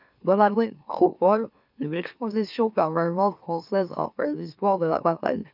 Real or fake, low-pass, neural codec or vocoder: fake; 5.4 kHz; autoencoder, 44.1 kHz, a latent of 192 numbers a frame, MeloTTS